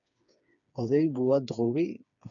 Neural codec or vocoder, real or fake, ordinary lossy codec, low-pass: codec, 16 kHz, 4 kbps, FreqCodec, smaller model; fake; none; 7.2 kHz